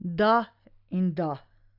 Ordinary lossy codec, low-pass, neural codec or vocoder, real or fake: none; 5.4 kHz; none; real